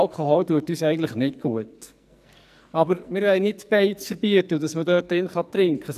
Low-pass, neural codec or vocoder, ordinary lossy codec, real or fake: 14.4 kHz; codec, 44.1 kHz, 2.6 kbps, SNAC; none; fake